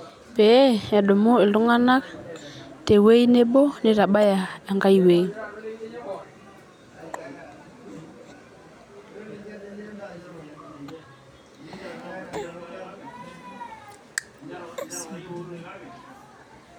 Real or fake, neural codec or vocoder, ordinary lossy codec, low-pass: real; none; none; 19.8 kHz